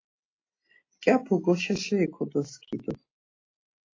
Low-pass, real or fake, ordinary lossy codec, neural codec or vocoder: 7.2 kHz; real; AAC, 32 kbps; none